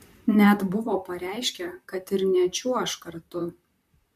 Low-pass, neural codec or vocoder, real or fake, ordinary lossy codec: 14.4 kHz; vocoder, 44.1 kHz, 128 mel bands, Pupu-Vocoder; fake; MP3, 64 kbps